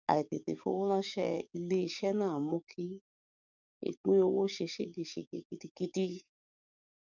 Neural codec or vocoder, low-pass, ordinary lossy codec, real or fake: codec, 44.1 kHz, 7.8 kbps, DAC; 7.2 kHz; none; fake